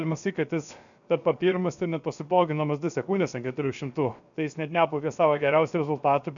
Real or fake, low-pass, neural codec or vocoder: fake; 7.2 kHz; codec, 16 kHz, 0.7 kbps, FocalCodec